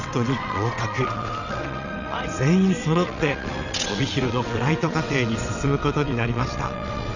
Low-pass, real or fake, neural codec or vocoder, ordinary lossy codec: 7.2 kHz; fake; vocoder, 22.05 kHz, 80 mel bands, WaveNeXt; none